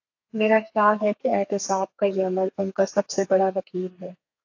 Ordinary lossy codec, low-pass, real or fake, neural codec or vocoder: AAC, 48 kbps; 7.2 kHz; fake; codec, 32 kHz, 1.9 kbps, SNAC